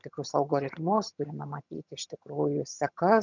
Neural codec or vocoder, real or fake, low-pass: vocoder, 22.05 kHz, 80 mel bands, HiFi-GAN; fake; 7.2 kHz